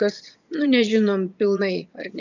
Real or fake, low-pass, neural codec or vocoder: fake; 7.2 kHz; vocoder, 22.05 kHz, 80 mel bands, Vocos